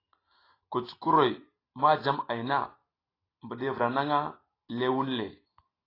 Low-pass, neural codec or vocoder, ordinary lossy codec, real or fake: 5.4 kHz; none; AAC, 24 kbps; real